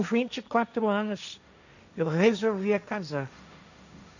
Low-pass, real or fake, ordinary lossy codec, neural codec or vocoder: 7.2 kHz; fake; none; codec, 16 kHz, 1.1 kbps, Voila-Tokenizer